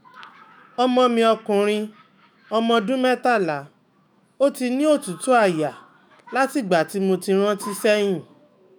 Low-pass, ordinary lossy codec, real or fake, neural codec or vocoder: none; none; fake; autoencoder, 48 kHz, 128 numbers a frame, DAC-VAE, trained on Japanese speech